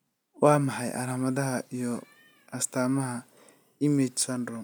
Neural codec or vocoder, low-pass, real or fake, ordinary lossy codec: none; none; real; none